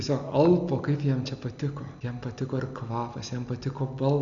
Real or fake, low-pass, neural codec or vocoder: real; 7.2 kHz; none